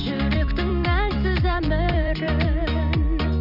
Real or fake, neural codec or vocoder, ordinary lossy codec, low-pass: fake; vocoder, 44.1 kHz, 128 mel bands every 256 samples, BigVGAN v2; none; 5.4 kHz